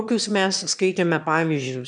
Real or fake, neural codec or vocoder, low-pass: fake; autoencoder, 22.05 kHz, a latent of 192 numbers a frame, VITS, trained on one speaker; 9.9 kHz